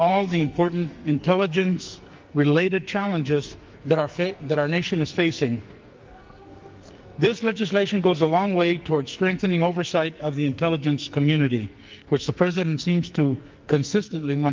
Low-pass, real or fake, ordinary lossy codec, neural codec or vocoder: 7.2 kHz; fake; Opus, 32 kbps; codec, 44.1 kHz, 2.6 kbps, SNAC